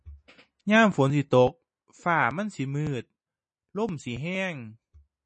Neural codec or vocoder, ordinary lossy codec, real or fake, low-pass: none; MP3, 32 kbps; real; 10.8 kHz